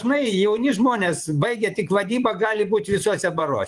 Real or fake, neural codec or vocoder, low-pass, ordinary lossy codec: fake; vocoder, 24 kHz, 100 mel bands, Vocos; 10.8 kHz; Opus, 24 kbps